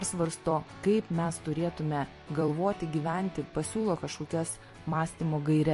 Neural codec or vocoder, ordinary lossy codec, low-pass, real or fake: vocoder, 44.1 kHz, 128 mel bands every 256 samples, BigVGAN v2; MP3, 48 kbps; 14.4 kHz; fake